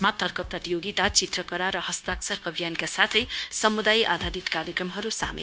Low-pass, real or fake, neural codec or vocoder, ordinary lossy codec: none; fake; codec, 16 kHz, 0.9 kbps, LongCat-Audio-Codec; none